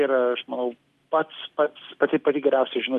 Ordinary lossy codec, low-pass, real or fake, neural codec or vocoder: Opus, 24 kbps; 14.4 kHz; real; none